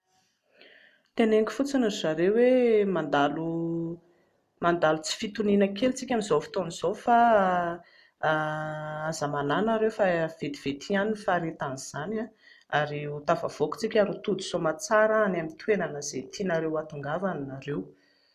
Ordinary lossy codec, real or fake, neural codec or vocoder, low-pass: none; real; none; 14.4 kHz